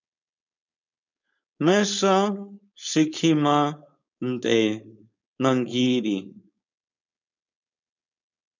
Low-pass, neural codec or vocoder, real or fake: 7.2 kHz; codec, 16 kHz, 4.8 kbps, FACodec; fake